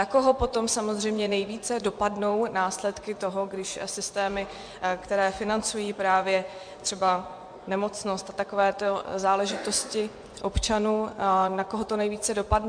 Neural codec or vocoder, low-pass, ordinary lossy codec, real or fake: vocoder, 24 kHz, 100 mel bands, Vocos; 9.9 kHz; MP3, 64 kbps; fake